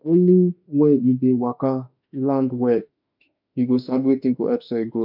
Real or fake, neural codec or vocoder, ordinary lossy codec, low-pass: fake; autoencoder, 48 kHz, 32 numbers a frame, DAC-VAE, trained on Japanese speech; none; 5.4 kHz